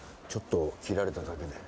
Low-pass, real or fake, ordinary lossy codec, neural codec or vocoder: none; real; none; none